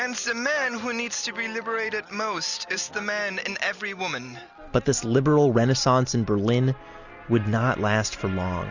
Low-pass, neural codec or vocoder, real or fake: 7.2 kHz; none; real